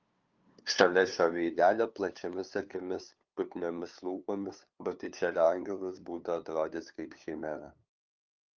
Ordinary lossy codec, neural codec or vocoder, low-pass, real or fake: Opus, 32 kbps; codec, 16 kHz, 2 kbps, FunCodec, trained on LibriTTS, 25 frames a second; 7.2 kHz; fake